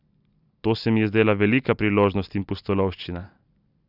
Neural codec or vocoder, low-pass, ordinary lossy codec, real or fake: none; 5.4 kHz; none; real